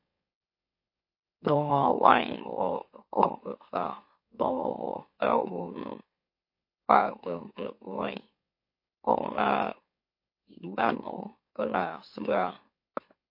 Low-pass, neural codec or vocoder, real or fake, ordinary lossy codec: 5.4 kHz; autoencoder, 44.1 kHz, a latent of 192 numbers a frame, MeloTTS; fake; MP3, 32 kbps